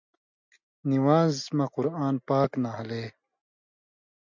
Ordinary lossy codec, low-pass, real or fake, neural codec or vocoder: MP3, 64 kbps; 7.2 kHz; real; none